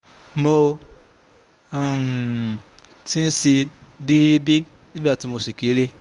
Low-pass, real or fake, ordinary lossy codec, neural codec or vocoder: 10.8 kHz; fake; none; codec, 24 kHz, 0.9 kbps, WavTokenizer, medium speech release version 1